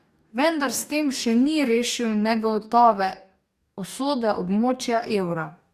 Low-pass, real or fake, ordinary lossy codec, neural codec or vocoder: 14.4 kHz; fake; Opus, 64 kbps; codec, 44.1 kHz, 2.6 kbps, DAC